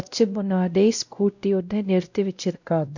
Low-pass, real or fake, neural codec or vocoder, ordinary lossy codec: 7.2 kHz; fake; codec, 16 kHz, 0.5 kbps, X-Codec, WavLM features, trained on Multilingual LibriSpeech; none